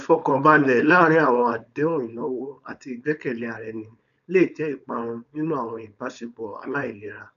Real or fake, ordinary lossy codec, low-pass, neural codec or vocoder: fake; none; 7.2 kHz; codec, 16 kHz, 4.8 kbps, FACodec